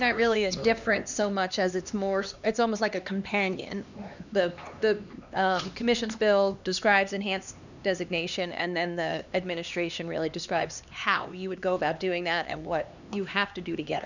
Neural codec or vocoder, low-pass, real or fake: codec, 16 kHz, 2 kbps, X-Codec, HuBERT features, trained on LibriSpeech; 7.2 kHz; fake